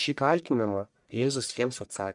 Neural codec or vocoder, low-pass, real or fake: codec, 44.1 kHz, 1.7 kbps, Pupu-Codec; 10.8 kHz; fake